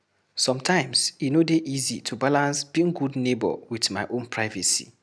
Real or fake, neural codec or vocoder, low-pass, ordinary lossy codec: real; none; none; none